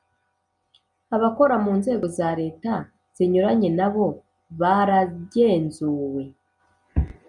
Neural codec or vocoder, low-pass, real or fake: none; 10.8 kHz; real